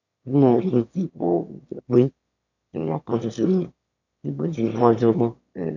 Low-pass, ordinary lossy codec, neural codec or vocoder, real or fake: 7.2 kHz; none; autoencoder, 22.05 kHz, a latent of 192 numbers a frame, VITS, trained on one speaker; fake